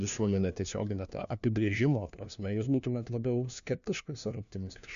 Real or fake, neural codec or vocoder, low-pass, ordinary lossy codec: fake; codec, 16 kHz, 1 kbps, FunCodec, trained on LibriTTS, 50 frames a second; 7.2 kHz; MP3, 96 kbps